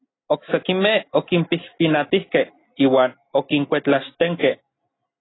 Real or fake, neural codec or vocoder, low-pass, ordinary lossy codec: real; none; 7.2 kHz; AAC, 16 kbps